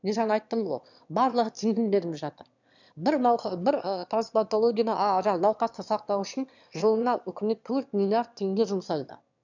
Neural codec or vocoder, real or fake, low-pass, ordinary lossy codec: autoencoder, 22.05 kHz, a latent of 192 numbers a frame, VITS, trained on one speaker; fake; 7.2 kHz; none